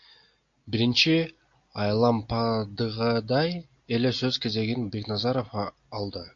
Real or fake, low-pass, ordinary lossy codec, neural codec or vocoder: real; 7.2 kHz; MP3, 48 kbps; none